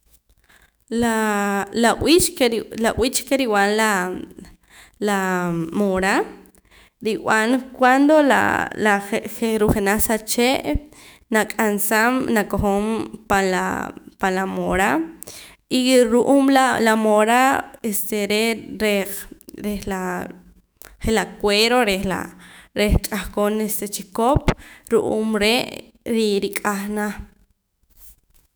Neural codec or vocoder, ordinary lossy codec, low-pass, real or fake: autoencoder, 48 kHz, 128 numbers a frame, DAC-VAE, trained on Japanese speech; none; none; fake